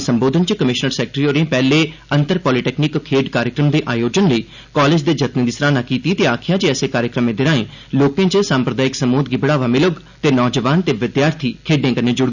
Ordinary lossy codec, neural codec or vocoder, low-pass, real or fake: none; none; 7.2 kHz; real